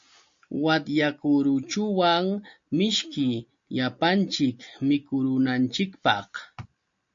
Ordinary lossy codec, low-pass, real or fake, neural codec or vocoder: MP3, 48 kbps; 7.2 kHz; real; none